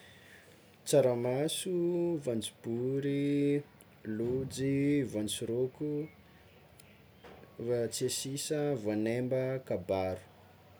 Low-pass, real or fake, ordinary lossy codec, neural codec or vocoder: none; real; none; none